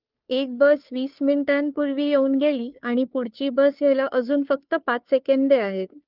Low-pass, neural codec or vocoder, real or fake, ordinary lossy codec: 5.4 kHz; codec, 16 kHz, 2 kbps, FunCodec, trained on Chinese and English, 25 frames a second; fake; Opus, 32 kbps